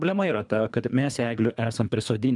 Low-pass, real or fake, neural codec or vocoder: 10.8 kHz; fake; codec, 24 kHz, 3 kbps, HILCodec